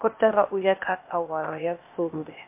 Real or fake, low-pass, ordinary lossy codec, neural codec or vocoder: fake; 3.6 kHz; MP3, 24 kbps; codec, 16 kHz, 0.8 kbps, ZipCodec